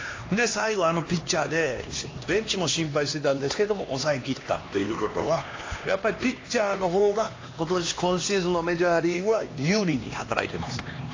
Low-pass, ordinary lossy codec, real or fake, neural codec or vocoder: 7.2 kHz; AAC, 32 kbps; fake; codec, 16 kHz, 2 kbps, X-Codec, HuBERT features, trained on LibriSpeech